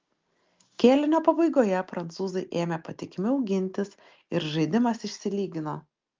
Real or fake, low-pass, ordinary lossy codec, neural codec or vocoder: real; 7.2 kHz; Opus, 32 kbps; none